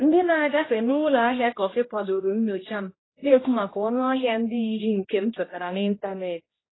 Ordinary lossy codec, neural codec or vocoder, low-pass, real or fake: AAC, 16 kbps; codec, 16 kHz, 1 kbps, X-Codec, HuBERT features, trained on general audio; 7.2 kHz; fake